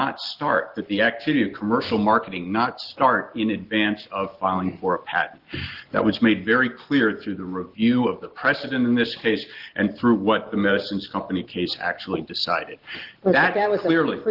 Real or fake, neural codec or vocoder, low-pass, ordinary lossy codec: real; none; 5.4 kHz; Opus, 16 kbps